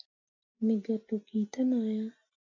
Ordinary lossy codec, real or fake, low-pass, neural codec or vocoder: AAC, 48 kbps; real; 7.2 kHz; none